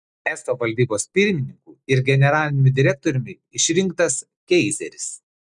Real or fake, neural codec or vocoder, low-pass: real; none; 10.8 kHz